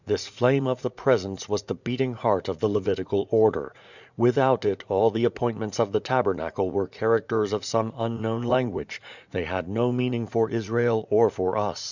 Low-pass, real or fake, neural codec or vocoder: 7.2 kHz; fake; vocoder, 44.1 kHz, 80 mel bands, Vocos